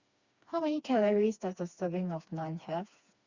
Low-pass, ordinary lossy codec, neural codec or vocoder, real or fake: 7.2 kHz; Opus, 64 kbps; codec, 16 kHz, 2 kbps, FreqCodec, smaller model; fake